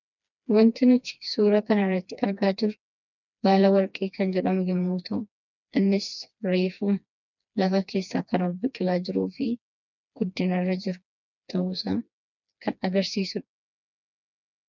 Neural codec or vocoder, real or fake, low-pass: codec, 16 kHz, 2 kbps, FreqCodec, smaller model; fake; 7.2 kHz